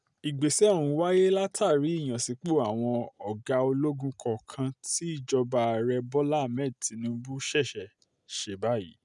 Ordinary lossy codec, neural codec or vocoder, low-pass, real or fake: none; none; 10.8 kHz; real